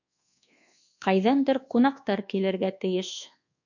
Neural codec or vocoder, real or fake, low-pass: codec, 24 kHz, 1.2 kbps, DualCodec; fake; 7.2 kHz